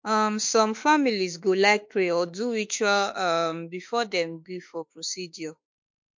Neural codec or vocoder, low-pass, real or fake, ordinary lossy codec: autoencoder, 48 kHz, 32 numbers a frame, DAC-VAE, trained on Japanese speech; 7.2 kHz; fake; MP3, 48 kbps